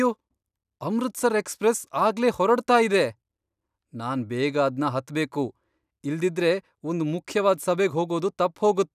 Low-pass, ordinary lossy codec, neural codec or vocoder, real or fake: 14.4 kHz; none; none; real